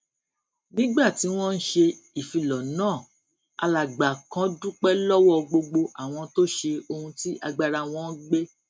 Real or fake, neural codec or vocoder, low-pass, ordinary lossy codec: real; none; none; none